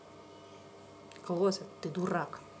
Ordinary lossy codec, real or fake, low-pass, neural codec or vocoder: none; real; none; none